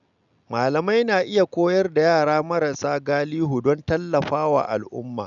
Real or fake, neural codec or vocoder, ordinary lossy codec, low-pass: real; none; none; 7.2 kHz